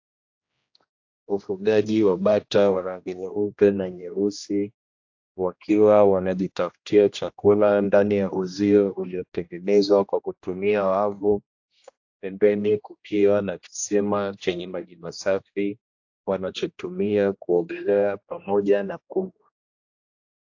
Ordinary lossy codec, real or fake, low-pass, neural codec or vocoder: AAC, 48 kbps; fake; 7.2 kHz; codec, 16 kHz, 1 kbps, X-Codec, HuBERT features, trained on general audio